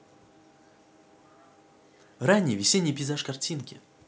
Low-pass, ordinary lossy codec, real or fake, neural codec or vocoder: none; none; real; none